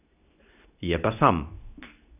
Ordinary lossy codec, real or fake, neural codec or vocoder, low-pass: none; fake; codec, 24 kHz, 0.9 kbps, WavTokenizer, medium speech release version 2; 3.6 kHz